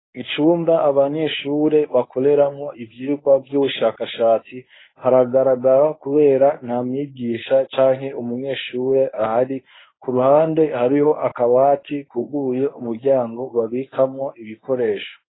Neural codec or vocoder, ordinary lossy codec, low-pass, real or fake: codec, 24 kHz, 0.9 kbps, WavTokenizer, medium speech release version 1; AAC, 16 kbps; 7.2 kHz; fake